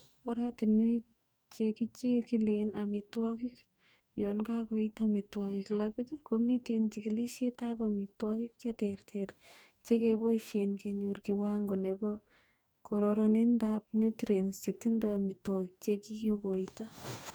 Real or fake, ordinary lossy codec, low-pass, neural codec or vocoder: fake; none; none; codec, 44.1 kHz, 2.6 kbps, DAC